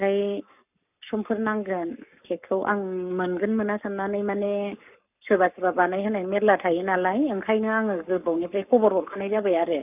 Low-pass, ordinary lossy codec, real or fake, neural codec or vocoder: 3.6 kHz; none; fake; codec, 44.1 kHz, 7.8 kbps, Pupu-Codec